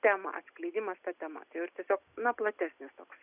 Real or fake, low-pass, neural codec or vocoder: real; 3.6 kHz; none